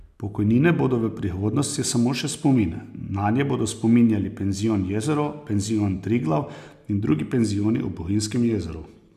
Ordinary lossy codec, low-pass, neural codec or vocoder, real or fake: none; 14.4 kHz; none; real